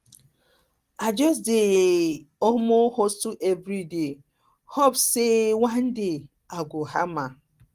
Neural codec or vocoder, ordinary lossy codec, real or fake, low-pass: none; Opus, 24 kbps; real; 14.4 kHz